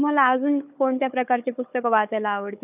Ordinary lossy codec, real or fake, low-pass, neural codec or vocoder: none; fake; 3.6 kHz; codec, 16 kHz, 4 kbps, FunCodec, trained on Chinese and English, 50 frames a second